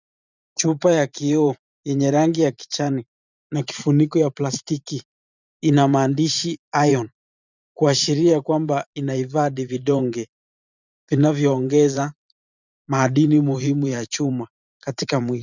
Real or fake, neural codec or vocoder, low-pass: fake; vocoder, 44.1 kHz, 128 mel bands every 512 samples, BigVGAN v2; 7.2 kHz